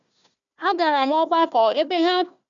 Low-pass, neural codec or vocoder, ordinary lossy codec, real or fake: 7.2 kHz; codec, 16 kHz, 1 kbps, FunCodec, trained on Chinese and English, 50 frames a second; none; fake